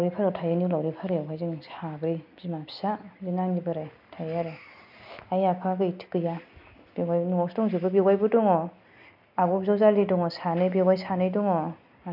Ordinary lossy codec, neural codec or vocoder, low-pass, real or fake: none; none; 5.4 kHz; real